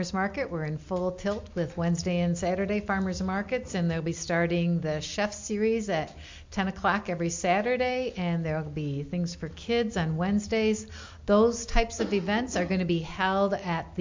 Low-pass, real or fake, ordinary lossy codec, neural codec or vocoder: 7.2 kHz; real; MP3, 48 kbps; none